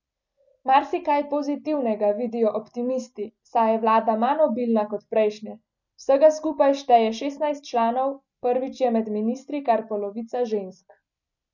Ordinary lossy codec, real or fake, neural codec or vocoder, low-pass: none; real; none; 7.2 kHz